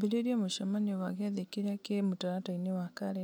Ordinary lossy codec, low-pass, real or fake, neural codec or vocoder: none; none; real; none